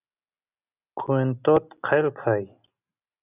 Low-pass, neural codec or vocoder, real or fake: 3.6 kHz; none; real